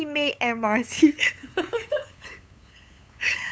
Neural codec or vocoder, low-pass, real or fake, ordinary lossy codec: codec, 16 kHz, 8 kbps, FunCodec, trained on LibriTTS, 25 frames a second; none; fake; none